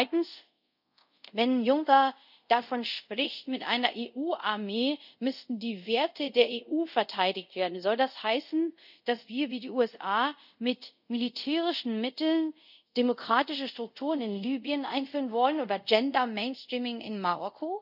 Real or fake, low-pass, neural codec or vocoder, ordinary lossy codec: fake; 5.4 kHz; codec, 24 kHz, 0.5 kbps, DualCodec; none